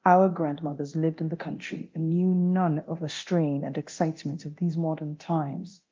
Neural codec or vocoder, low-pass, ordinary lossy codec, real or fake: codec, 24 kHz, 0.9 kbps, DualCodec; 7.2 kHz; Opus, 32 kbps; fake